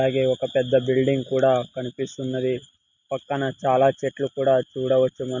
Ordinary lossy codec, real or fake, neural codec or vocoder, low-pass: none; real; none; 7.2 kHz